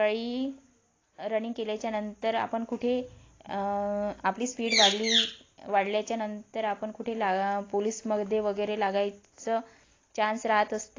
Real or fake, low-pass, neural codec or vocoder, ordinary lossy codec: real; 7.2 kHz; none; AAC, 32 kbps